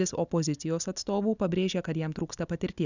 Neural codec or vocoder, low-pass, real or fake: none; 7.2 kHz; real